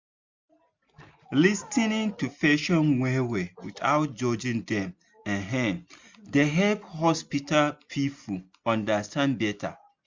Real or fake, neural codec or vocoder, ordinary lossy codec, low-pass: real; none; MP3, 64 kbps; 7.2 kHz